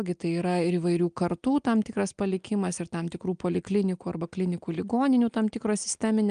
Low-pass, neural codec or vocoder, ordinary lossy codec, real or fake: 9.9 kHz; none; Opus, 32 kbps; real